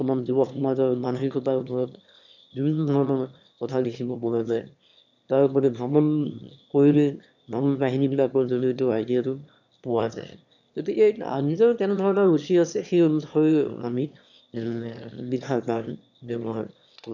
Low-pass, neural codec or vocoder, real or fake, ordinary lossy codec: 7.2 kHz; autoencoder, 22.05 kHz, a latent of 192 numbers a frame, VITS, trained on one speaker; fake; none